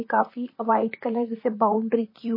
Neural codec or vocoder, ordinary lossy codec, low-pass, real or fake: vocoder, 44.1 kHz, 128 mel bands, Pupu-Vocoder; MP3, 24 kbps; 5.4 kHz; fake